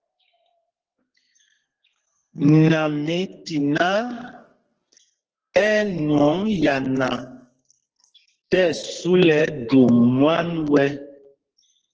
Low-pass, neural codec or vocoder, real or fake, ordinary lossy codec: 7.2 kHz; codec, 32 kHz, 1.9 kbps, SNAC; fake; Opus, 16 kbps